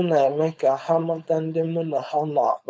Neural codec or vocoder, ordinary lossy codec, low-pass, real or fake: codec, 16 kHz, 4.8 kbps, FACodec; none; none; fake